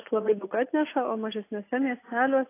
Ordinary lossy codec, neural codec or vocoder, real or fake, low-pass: AAC, 24 kbps; none; real; 3.6 kHz